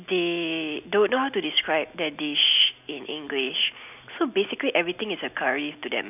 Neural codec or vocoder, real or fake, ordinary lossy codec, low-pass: none; real; none; 3.6 kHz